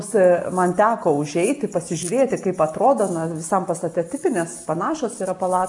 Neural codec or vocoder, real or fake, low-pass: none; real; 10.8 kHz